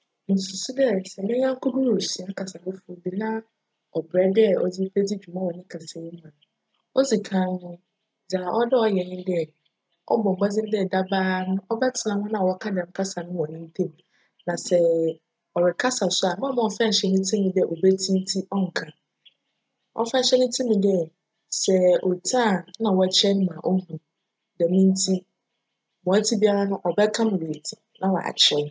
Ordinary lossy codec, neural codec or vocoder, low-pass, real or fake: none; none; none; real